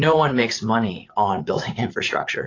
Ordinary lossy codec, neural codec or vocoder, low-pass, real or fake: AAC, 32 kbps; vocoder, 44.1 kHz, 80 mel bands, Vocos; 7.2 kHz; fake